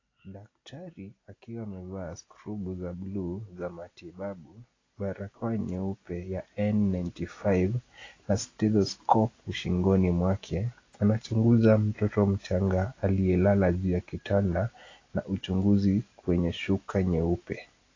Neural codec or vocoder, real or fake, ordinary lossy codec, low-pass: none; real; AAC, 32 kbps; 7.2 kHz